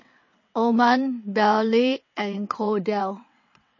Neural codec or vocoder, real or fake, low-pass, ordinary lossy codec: vocoder, 22.05 kHz, 80 mel bands, WaveNeXt; fake; 7.2 kHz; MP3, 32 kbps